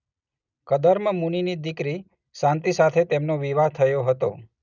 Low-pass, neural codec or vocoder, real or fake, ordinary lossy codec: 7.2 kHz; none; real; none